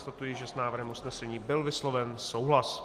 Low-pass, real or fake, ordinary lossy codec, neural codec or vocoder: 14.4 kHz; real; Opus, 24 kbps; none